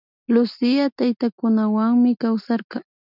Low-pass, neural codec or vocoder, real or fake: 5.4 kHz; none; real